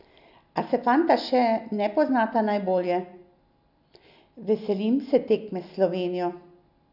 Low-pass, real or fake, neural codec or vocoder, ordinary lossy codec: 5.4 kHz; real; none; none